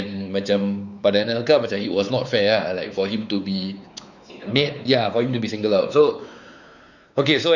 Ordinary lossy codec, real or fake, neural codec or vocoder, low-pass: none; fake; codec, 16 kHz, 4 kbps, X-Codec, WavLM features, trained on Multilingual LibriSpeech; 7.2 kHz